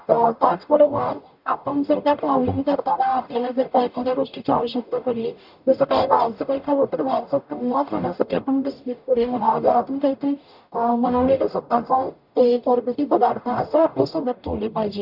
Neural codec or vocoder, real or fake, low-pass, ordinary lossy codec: codec, 44.1 kHz, 0.9 kbps, DAC; fake; 5.4 kHz; none